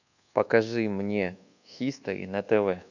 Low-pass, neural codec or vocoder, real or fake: 7.2 kHz; codec, 24 kHz, 1.2 kbps, DualCodec; fake